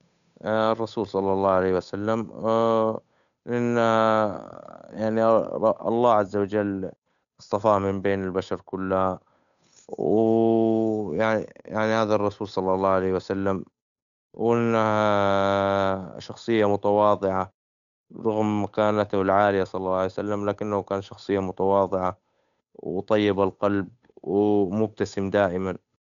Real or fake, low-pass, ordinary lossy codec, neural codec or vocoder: fake; 7.2 kHz; none; codec, 16 kHz, 8 kbps, FunCodec, trained on Chinese and English, 25 frames a second